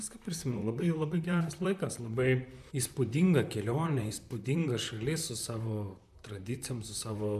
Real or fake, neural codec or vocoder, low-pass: fake; vocoder, 44.1 kHz, 128 mel bands, Pupu-Vocoder; 14.4 kHz